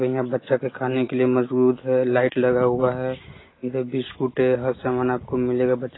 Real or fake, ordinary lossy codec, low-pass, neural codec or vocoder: real; AAC, 16 kbps; 7.2 kHz; none